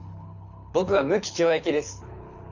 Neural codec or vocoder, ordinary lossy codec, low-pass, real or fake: codec, 24 kHz, 6 kbps, HILCodec; none; 7.2 kHz; fake